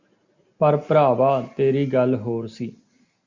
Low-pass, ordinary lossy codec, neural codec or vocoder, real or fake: 7.2 kHz; Opus, 64 kbps; none; real